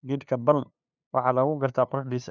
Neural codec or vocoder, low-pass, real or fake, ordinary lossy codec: codec, 16 kHz, 2 kbps, FunCodec, trained on LibriTTS, 25 frames a second; 7.2 kHz; fake; none